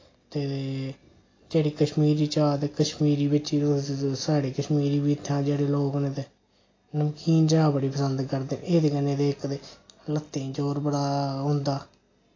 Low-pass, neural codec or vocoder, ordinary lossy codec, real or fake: 7.2 kHz; none; AAC, 32 kbps; real